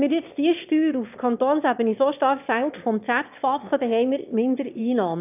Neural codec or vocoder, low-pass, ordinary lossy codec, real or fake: autoencoder, 22.05 kHz, a latent of 192 numbers a frame, VITS, trained on one speaker; 3.6 kHz; none; fake